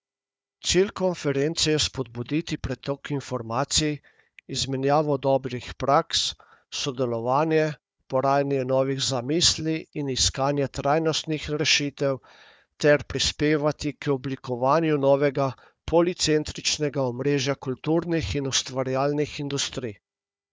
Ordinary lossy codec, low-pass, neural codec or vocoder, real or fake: none; none; codec, 16 kHz, 4 kbps, FunCodec, trained on Chinese and English, 50 frames a second; fake